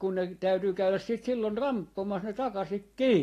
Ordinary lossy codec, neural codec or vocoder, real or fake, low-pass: AAC, 48 kbps; none; real; 14.4 kHz